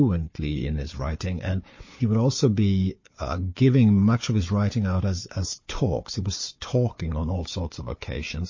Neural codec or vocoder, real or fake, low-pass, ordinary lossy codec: codec, 16 kHz, 4 kbps, FunCodec, trained on Chinese and English, 50 frames a second; fake; 7.2 kHz; MP3, 32 kbps